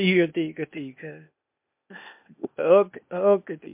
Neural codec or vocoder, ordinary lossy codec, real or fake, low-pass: codec, 16 kHz, 0.8 kbps, ZipCodec; MP3, 32 kbps; fake; 3.6 kHz